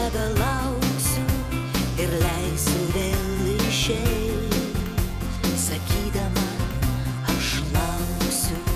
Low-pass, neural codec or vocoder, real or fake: 14.4 kHz; vocoder, 48 kHz, 128 mel bands, Vocos; fake